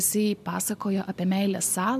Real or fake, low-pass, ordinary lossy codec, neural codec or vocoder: real; 14.4 kHz; AAC, 96 kbps; none